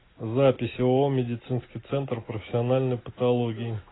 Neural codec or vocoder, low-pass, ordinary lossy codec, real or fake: none; 7.2 kHz; AAC, 16 kbps; real